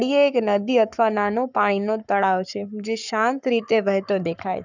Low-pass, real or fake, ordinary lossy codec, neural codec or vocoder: 7.2 kHz; fake; none; codec, 44.1 kHz, 7.8 kbps, Pupu-Codec